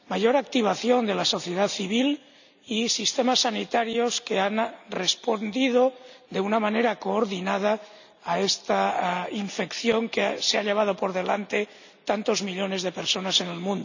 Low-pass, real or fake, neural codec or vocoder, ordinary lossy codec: 7.2 kHz; real; none; none